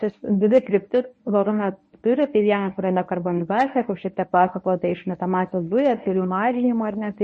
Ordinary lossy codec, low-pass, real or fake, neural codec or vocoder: MP3, 32 kbps; 10.8 kHz; fake; codec, 24 kHz, 0.9 kbps, WavTokenizer, medium speech release version 1